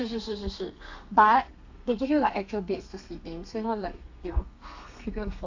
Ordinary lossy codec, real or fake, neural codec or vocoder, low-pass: AAC, 48 kbps; fake; codec, 32 kHz, 1.9 kbps, SNAC; 7.2 kHz